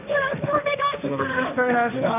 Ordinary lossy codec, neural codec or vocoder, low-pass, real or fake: none; codec, 16 kHz, 1.1 kbps, Voila-Tokenizer; 3.6 kHz; fake